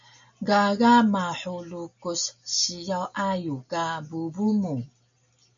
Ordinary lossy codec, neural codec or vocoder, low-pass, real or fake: MP3, 64 kbps; none; 7.2 kHz; real